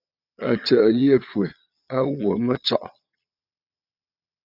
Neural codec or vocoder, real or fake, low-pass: vocoder, 22.05 kHz, 80 mel bands, Vocos; fake; 5.4 kHz